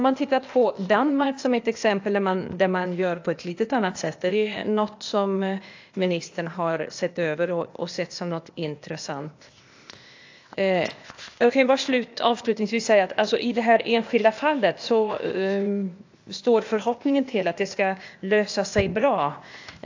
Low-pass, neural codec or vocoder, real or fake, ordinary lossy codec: 7.2 kHz; codec, 16 kHz, 0.8 kbps, ZipCodec; fake; AAC, 48 kbps